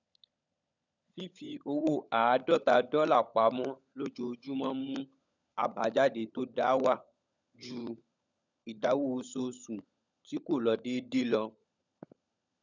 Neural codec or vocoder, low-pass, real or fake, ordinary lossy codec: codec, 16 kHz, 16 kbps, FunCodec, trained on LibriTTS, 50 frames a second; 7.2 kHz; fake; none